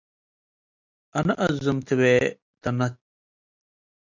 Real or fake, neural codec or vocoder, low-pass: real; none; 7.2 kHz